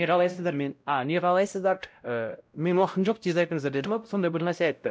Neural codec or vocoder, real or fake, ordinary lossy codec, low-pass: codec, 16 kHz, 0.5 kbps, X-Codec, WavLM features, trained on Multilingual LibriSpeech; fake; none; none